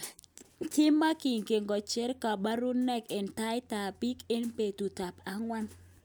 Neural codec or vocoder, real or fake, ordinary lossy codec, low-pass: none; real; none; none